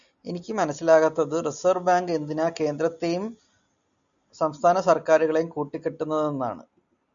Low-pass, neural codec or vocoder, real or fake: 7.2 kHz; none; real